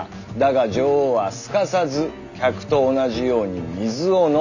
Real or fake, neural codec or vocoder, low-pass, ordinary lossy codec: real; none; 7.2 kHz; none